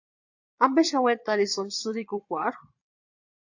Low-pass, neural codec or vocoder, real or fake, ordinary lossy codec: 7.2 kHz; vocoder, 22.05 kHz, 80 mel bands, Vocos; fake; AAC, 48 kbps